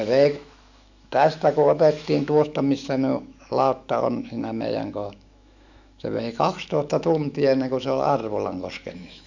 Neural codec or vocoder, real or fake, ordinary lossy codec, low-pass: autoencoder, 48 kHz, 128 numbers a frame, DAC-VAE, trained on Japanese speech; fake; none; 7.2 kHz